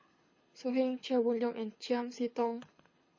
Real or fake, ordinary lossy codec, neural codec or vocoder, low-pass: fake; MP3, 32 kbps; codec, 24 kHz, 6 kbps, HILCodec; 7.2 kHz